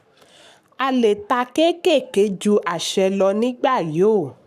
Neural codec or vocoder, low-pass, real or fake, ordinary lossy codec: codec, 44.1 kHz, 7.8 kbps, Pupu-Codec; 14.4 kHz; fake; none